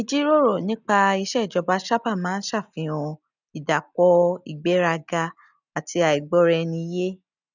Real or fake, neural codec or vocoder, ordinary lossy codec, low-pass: real; none; none; 7.2 kHz